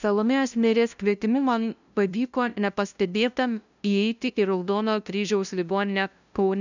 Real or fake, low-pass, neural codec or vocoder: fake; 7.2 kHz; codec, 16 kHz, 0.5 kbps, FunCodec, trained on LibriTTS, 25 frames a second